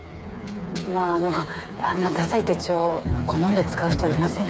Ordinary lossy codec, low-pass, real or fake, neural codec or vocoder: none; none; fake; codec, 16 kHz, 4 kbps, FreqCodec, smaller model